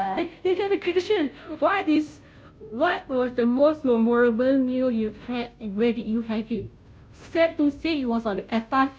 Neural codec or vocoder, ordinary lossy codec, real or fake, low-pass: codec, 16 kHz, 0.5 kbps, FunCodec, trained on Chinese and English, 25 frames a second; none; fake; none